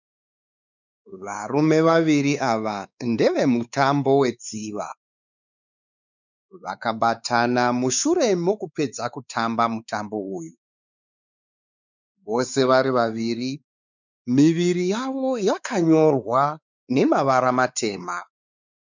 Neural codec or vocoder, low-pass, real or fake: codec, 16 kHz, 4 kbps, X-Codec, WavLM features, trained on Multilingual LibriSpeech; 7.2 kHz; fake